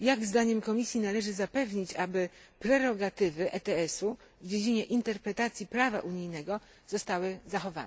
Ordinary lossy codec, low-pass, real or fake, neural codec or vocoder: none; none; real; none